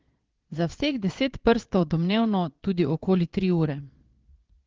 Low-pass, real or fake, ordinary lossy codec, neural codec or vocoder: 7.2 kHz; fake; Opus, 16 kbps; vocoder, 22.05 kHz, 80 mel bands, Vocos